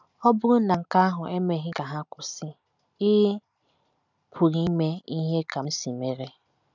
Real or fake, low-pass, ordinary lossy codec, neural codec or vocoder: real; 7.2 kHz; none; none